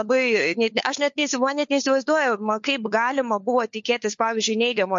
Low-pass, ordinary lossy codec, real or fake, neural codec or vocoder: 7.2 kHz; MP3, 48 kbps; fake; codec, 16 kHz, 4 kbps, FunCodec, trained on LibriTTS, 50 frames a second